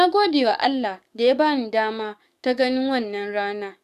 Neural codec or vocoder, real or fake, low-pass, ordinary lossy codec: codec, 44.1 kHz, 7.8 kbps, DAC; fake; 14.4 kHz; none